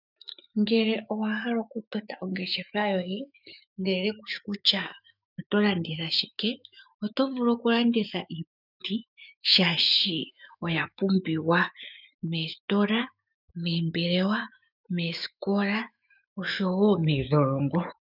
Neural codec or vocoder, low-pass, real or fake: autoencoder, 48 kHz, 128 numbers a frame, DAC-VAE, trained on Japanese speech; 5.4 kHz; fake